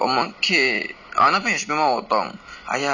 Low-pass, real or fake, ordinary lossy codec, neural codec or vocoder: 7.2 kHz; real; none; none